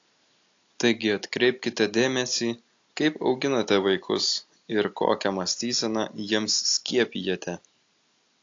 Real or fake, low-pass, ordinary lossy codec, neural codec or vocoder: real; 7.2 kHz; AAC, 48 kbps; none